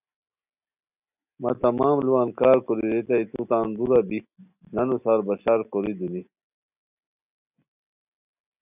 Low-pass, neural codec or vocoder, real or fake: 3.6 kHz; none; real